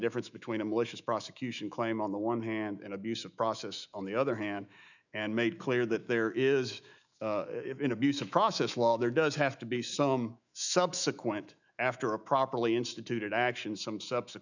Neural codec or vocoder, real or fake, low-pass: autoencoder, 48 kHz, 128 numbers a frame, DAC-VAE, trained on Japanese speech; fake; 7.2 kHz